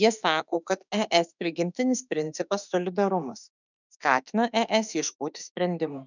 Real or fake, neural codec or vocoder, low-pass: fake; autoencoder, 48 kHz, 32 numbers a frame, DAC-VAE, trained on Japanese speech; 7.2 kHz